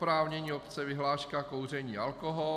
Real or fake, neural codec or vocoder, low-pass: real; none; 14.4 kHz